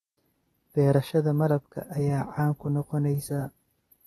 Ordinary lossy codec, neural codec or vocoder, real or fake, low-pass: AAC, 32 kbps; none; real; 19.8 kHz